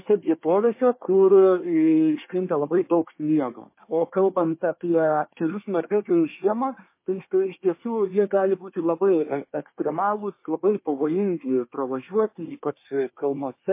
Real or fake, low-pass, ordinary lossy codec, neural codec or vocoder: fake; 3.6 kHz; MP3, 24 kbps; codec, 24 kHz, 1 kbps, SNAC